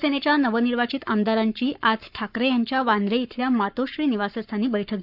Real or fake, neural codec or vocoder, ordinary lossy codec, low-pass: fake; codec, 44.1 kHz, 7.8 kbps, Pupu-Codec; none; 5.4 kHz